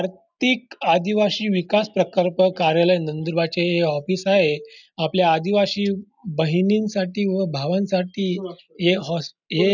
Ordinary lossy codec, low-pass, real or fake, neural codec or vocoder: none; 7.2 kHz; real; none